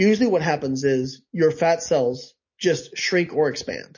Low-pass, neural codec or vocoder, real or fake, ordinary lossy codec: 7.2 kHz; none; real; MP3, 32 kbps